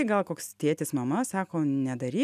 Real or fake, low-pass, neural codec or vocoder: real; 14.4 kHz; none